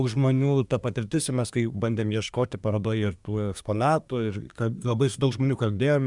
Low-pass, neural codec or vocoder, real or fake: 10.8 kHz; codec, 24 kHz, 1 kbps, SNAC; fake